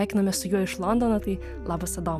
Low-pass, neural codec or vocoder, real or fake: 14.4 kHz; none; real